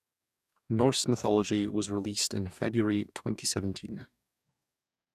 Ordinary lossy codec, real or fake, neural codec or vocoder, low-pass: none; fake; codec, 44.1 kHz, 2.6 kbps, DAC; 14.4 kHz